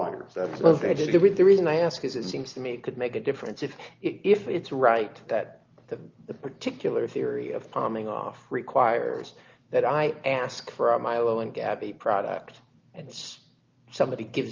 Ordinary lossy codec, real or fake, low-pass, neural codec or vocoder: Opus, 24 kbps; real; 7.2 kHz; none